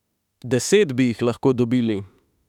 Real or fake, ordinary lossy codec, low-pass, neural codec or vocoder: fake; none; 19.8 kHz; autoencoder, 48 kHz, 32 numbers a frame, DAC-VAE, trained on Japanese speech